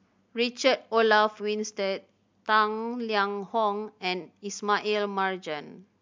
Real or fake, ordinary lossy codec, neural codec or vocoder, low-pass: real; MP3, 64 kbps; none; 7.2 kHz